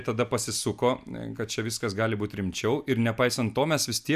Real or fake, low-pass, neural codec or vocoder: real; 14.4 kHz; none